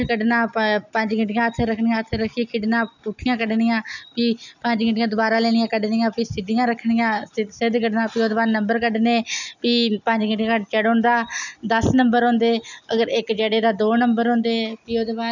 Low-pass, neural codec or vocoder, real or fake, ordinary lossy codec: 7.2 kHz; none; real; none